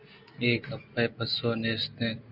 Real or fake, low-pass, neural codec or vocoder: real; 5.4 kHz; none